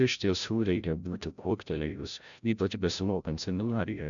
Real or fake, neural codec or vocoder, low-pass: fake; codec, 16 kHz, 0.5 kbps, FreqCodec, larger model; 7.2 kHz